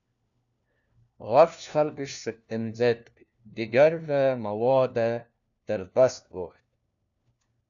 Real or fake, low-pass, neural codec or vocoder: fake; 7.2 kHz; codec, 16 kHz, 1 kbps, FunCodec, trained on LibriTTS, 50 frames a second